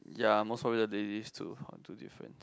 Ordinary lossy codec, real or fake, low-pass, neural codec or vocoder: none; real; none; none